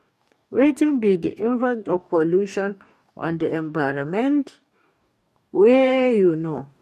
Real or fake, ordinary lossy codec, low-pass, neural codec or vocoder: fake; MP3, 64 kbps; 14.4 kHz; codec, 44.1 kHz, 2.6 kbps, DAC